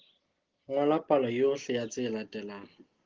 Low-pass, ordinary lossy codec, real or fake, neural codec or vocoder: 7.2 kHz; Opus, 16 kbps; real; none